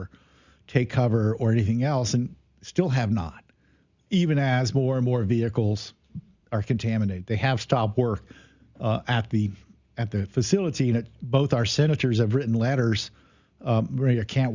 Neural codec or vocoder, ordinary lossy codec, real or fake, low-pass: none; Opus, 64 kbps; real; 7.2 kHz